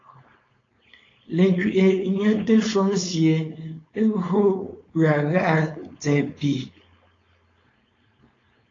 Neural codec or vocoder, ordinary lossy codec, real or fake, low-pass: codec, 16 kHz, 4.8 kbps, FACodec; AAC, 32 kbps; fake; 7.2 kHz